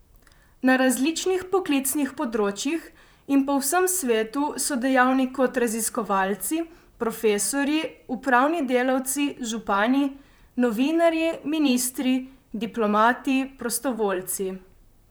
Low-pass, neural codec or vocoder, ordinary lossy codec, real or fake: none; vocoder, 44.1 kHz, 128 mel bands, Pupu-Vocoder; none; fake